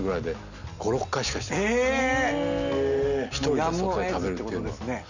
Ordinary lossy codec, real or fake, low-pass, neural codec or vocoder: none; real; 7.2 kHz; none